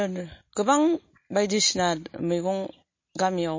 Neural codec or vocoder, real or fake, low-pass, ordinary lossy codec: none; real; 7.2 kHz; MP3, 32 kbps